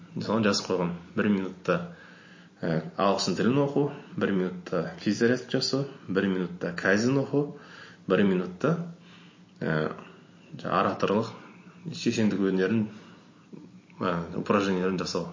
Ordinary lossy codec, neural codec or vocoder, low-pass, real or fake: MP3, 32 kbps; none; 7.2 kHz; real